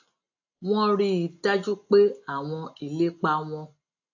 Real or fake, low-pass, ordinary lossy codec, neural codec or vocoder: real; 7.2 kHz; AAC, 48 kbps; none